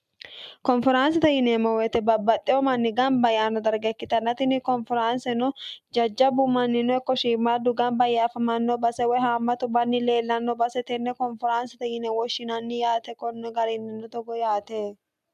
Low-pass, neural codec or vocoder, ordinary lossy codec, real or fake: 14.4 kHz; none; MP3, 96 kbps; real